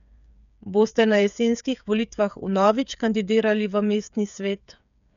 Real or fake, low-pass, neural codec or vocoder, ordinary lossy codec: fake; 7.2 kHz; codec, 16 kHz, 8 kbps, FreqCodec, smaller model; none